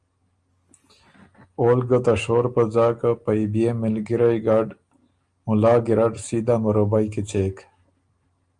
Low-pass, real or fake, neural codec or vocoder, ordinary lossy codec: 9.9 kHz; real; none; Opus, 32 kbps